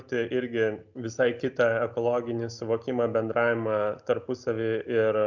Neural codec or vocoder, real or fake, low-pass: none; real; 7.2 kHz